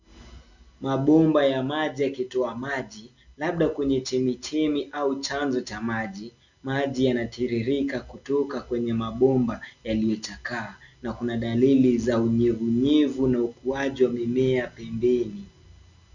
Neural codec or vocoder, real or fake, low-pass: none; real; 7.2 kHz